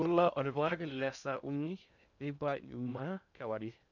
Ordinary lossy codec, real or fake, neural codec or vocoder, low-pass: none; fake; codec, 16 kHz in and 24 kHz out, 0.6 kbps, FocalCodec, streaming, 2048 codes; 7.2 kHz